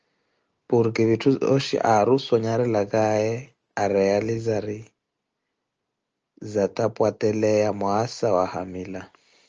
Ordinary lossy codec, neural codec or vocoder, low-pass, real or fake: Opus, 24 kbps; none; 7.2 kHz; real